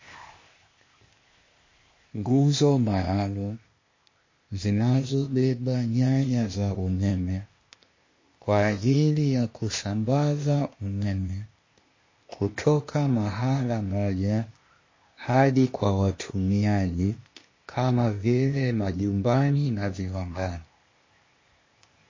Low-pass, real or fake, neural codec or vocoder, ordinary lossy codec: 7.2 kHz; fake; codec, 16 kHz, 0.8 kbps, ZipCodec; MP3, 32 kbps